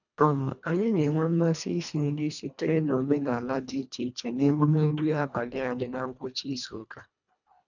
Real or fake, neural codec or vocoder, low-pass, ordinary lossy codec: fake; codec, 24 kHz, 1.5 kbps, HILCodec; 7.2 kHz; none